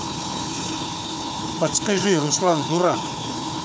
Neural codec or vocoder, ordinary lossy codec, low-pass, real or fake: codec, 16 kHz, 4 kbps, FunCodec, trained on Chinese and English, 50 frames a second; none; none; fake